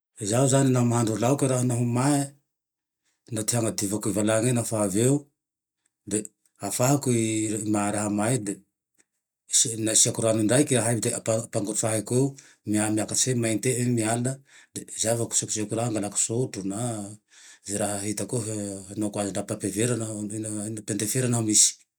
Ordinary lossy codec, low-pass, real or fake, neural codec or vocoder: none; none; real; none